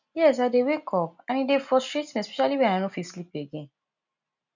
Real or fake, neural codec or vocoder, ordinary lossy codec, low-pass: real; none; none; 7.2 kHz